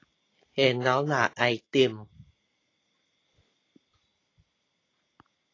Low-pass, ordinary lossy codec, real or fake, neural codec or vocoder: 7.2 kHz; AAC, 32 kbps; real; none